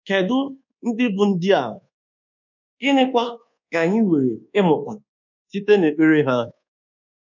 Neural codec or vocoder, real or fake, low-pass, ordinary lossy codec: codec, 24 kHz, 1.2 kbps, DualCodec; fake; 7.2 kHz; none